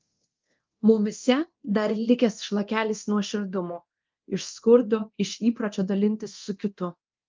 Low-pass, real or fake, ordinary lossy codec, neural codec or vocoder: 7.2 kHz; fake; Opus, 24 kbps; codec, 24 kHz, 0.9 kbps, DualCodec